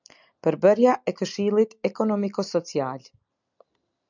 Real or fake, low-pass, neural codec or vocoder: real; 7.2 kHz; none